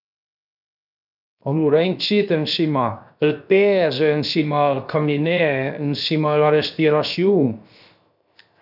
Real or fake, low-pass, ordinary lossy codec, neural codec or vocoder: fake; 5.4 kHz; none; codec, 16 kHz, 0.7 kbps, FocalCodec